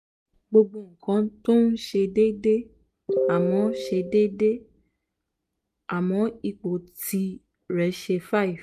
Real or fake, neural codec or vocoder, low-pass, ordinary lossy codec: real; none; 14.4 kHz; none